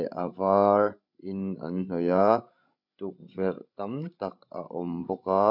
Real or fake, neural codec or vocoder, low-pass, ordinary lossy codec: fake; codec, 16 kHz, 16 kbps, FreqCodec, larger model; 5.4 kHz; none